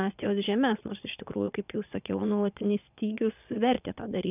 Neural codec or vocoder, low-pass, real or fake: vocoder, 22.05 kHz, 80 mel bands, Vocos; 3.6 kHz; fake